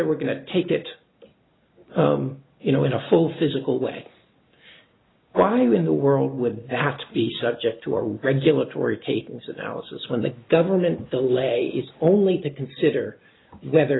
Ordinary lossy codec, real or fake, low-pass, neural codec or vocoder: AAC, 16 kbps; real; 7.2 kHz; none